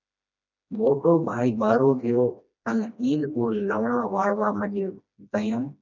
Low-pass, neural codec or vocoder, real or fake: 7.2 kHz; codec, 16 kHz, 1 kbps, FreqCodec, smaller model; fake